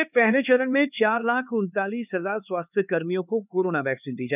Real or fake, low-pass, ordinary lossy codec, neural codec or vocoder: fake; 3.6 kHz; none; codec, 16 kHz, 4 kbps, X-Codec, HuBERT features, trained on LibriSpeech